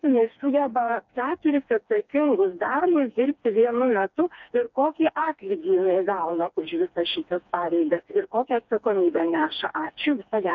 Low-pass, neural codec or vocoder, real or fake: 7.2 kHz; codec, 16 kHz, 2 kbps, FreqCodec, smaller model; fake